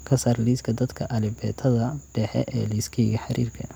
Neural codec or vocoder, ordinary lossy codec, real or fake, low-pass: none; none; real; none